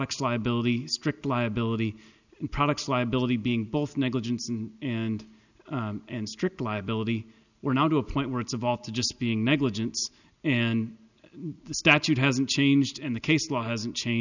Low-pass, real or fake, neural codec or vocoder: 7.2 kHz; real; none